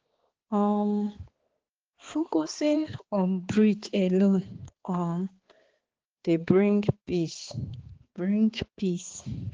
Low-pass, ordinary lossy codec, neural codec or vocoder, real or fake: 7.2 kHz; Opus, 16 kbps; codec, 16 kHz, 2 kbps, X-Codec, HuBERT features, trained on balanced general audio; fake